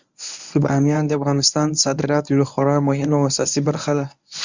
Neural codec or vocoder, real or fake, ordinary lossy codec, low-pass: codec, 24 kHz, 0.9 kbps, WavTokenizer, medium speech release version 1; fake; Opus, 64 kbps; 7.2 kHz